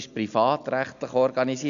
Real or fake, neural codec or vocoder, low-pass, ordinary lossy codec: real; none; 7.2 kHz; AAC, 96 kbps